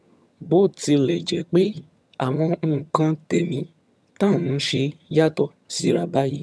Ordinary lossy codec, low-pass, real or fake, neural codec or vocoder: none; none; fake; vocoder, 22.05 kHz, 80 mel bands, HiFi-GAN